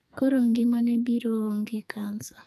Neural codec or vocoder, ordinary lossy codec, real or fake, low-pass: codec, 44.1 kHz, 2.6 kbps, SNAC; none; fake; 14.4 kHz